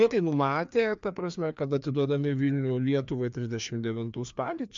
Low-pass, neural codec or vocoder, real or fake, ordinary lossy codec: 7.2 kHz; codec, 16 kHz, 2 kbps, FreqCodec, larger model; fake; MP3, 64 kbps